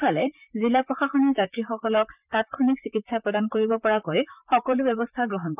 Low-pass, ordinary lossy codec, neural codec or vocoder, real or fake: 3.6 kHz; none; vocoder, 44.1 kHz, 128 mel bands, Pupu-Vocoder; fake